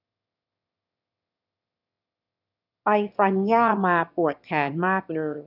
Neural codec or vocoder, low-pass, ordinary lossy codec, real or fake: autoencoder, 22.05 kHz, a latent of 192 numbers a frame, VITS, trained on one speaker; 5.4 kHz; none; fake